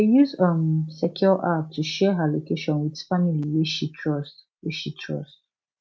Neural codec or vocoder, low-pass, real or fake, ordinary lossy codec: none; none; real; none